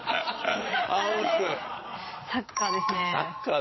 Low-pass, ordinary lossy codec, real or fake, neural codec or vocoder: 7.2 kHz; MP3, 24 kbps; fake; vocoder, 22.05 kHz, 80 mel bands, Vocos